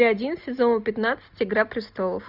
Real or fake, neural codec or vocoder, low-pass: real; none; 5.4 kHz